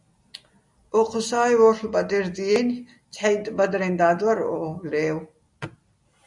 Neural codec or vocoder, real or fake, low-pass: none; real; 10.8 kHz